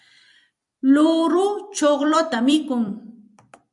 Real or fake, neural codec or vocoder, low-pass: fake; vocoder, 44.1 kHz, 128 mel bands every 256 samples, BigVGAN v2; 10.8 kHz